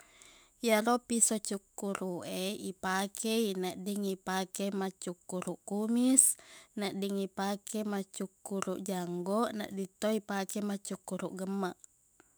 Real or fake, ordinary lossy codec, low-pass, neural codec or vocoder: fake; none; none; autoencoder, 48 kHz, 128 numbers a frame, DAC-VAE, trained on Japanese speech